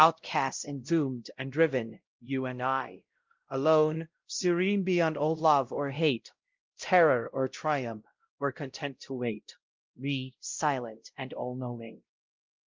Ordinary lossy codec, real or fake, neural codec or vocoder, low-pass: Opus, 32 kbps; fake; codec, 16 kHz, 0.5 kbps, X-Codec, WavLM features, trained on Multilingual LibriSpeech; 7.2 kHz